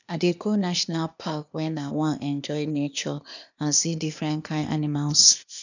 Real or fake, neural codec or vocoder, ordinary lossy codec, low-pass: fake; codec, 16 kHz, 0.8 kbps, ZipCodec; none; 7.2 kHz